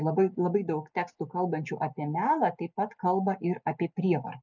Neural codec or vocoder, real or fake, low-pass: none; real; 7.2 kHz